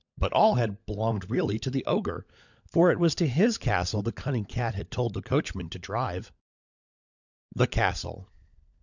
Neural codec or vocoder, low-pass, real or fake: codec, 16 kHz, 16 kbps, FunCodec, trained on LibriTTS, 50 frames a second; 7.2 kHz; fake